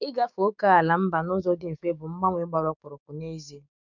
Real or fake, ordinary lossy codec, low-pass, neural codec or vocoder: fake; none; 7.2 kHz; codec, 16 kHz, 6 kbps, DAC